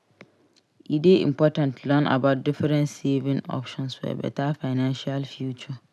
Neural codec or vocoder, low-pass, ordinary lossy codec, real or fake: none; none; none; real